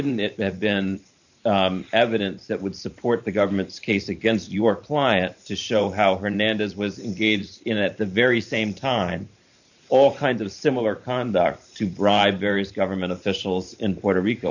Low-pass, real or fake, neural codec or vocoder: 7.2 kHz; fake; vocoder, 44.1 kHz, 128 mel bands every 512 samples, BigVGAN v2